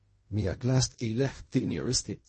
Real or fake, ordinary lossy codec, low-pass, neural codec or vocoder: fake; MP3, 32 kbps; 10.8 kHz; codec, 16 kHz in and 24 kHz out, 0.4 kbps, LongCat-Audio-Codec, fine tuned four codebook decoder